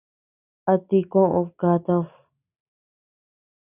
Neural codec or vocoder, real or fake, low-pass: none; real; 3.6 kHz